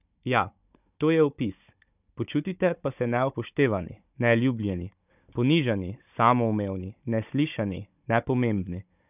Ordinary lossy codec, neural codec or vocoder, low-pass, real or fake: none; codec, 16 kHz, 16 kbps, FunCodec, trained on Chinese and English, 50 frames a second; 3.6 kHz; fake